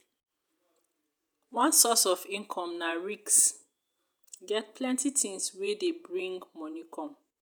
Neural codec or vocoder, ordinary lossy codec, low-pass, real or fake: vocoder, 48 kHz, 128 mel bands, Vocos; none; none; fake